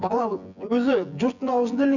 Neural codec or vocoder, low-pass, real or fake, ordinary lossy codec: vocoder, 24 kHz, 100 mel bands, Vocos; 7.2 kHz; fake; none